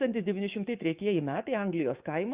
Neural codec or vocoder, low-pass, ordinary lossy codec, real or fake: codec, 44.1 kHz, 7.8 kbps, DAC; 3.6 kHz; Opus, 64 kbps; fake